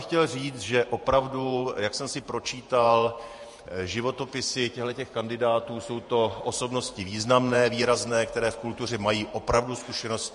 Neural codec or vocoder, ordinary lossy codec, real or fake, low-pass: vocoder, 48 kHz, 128 mel bands, Vocos; MP3, 48 kbps; fake; 14.4 kHz